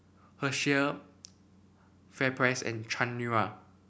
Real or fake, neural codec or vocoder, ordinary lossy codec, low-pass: real; none; none; none